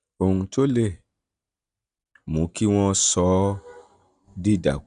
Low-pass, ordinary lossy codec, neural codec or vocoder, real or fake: 10.8 kHz; none; none; real